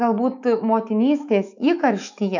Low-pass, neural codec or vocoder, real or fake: 7.2 kHz; autoencoder, 48 kHz, 128 numbers a frame, DAC-VAE, trained on Japanese speech; fake